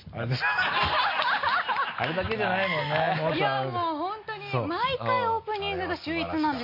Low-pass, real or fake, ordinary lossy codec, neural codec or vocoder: 5.4 kHz; real; MP3, 32 kbps; none